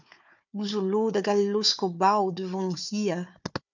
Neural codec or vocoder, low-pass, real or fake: codec, 16 kHz, 4 kbps, FunCodec, trained on Chinese and English, 50 frames a second; 7.2 kHz; fake